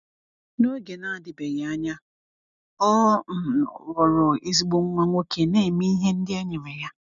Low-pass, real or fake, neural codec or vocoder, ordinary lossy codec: 7.2 kHz; real; none; none